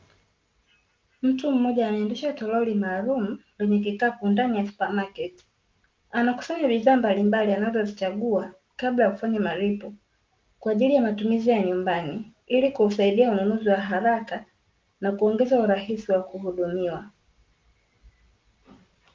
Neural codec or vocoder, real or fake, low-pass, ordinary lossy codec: none; real; 7.2 kHz; Opus, 32 kbps